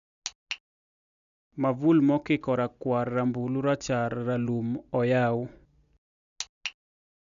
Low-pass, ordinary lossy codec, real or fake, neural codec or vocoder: 7.2 kHz; none; real; none